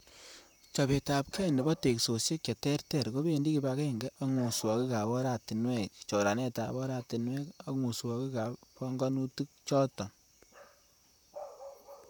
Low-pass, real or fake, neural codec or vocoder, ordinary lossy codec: none; fake; vocoder, 44.1 kHz, 128 mel bands, Pupu-Vocoder; none